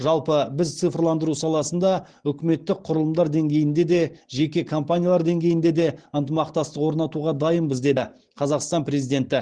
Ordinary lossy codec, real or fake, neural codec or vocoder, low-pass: Opus, 16 kbps; real; none; 9.9 kHz